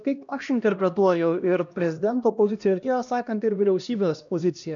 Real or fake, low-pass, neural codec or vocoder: fake; 7.2 kHz; codec, 16 kHz, 1 kbps, X-Codec, HuBERT features, trained on LibriSpeech